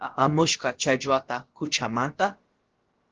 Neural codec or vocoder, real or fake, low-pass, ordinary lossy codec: codec, 16 kHz, about 1 kbps, DyCAST, with the encoder's durations; fake; 7.2 kHz; Opus, 16 kbps